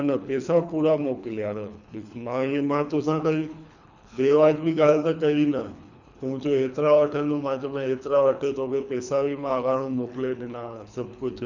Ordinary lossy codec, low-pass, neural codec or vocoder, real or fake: none; 7.2 kHz; codec, 24 kHz, 3 kbps, HILCodec; fake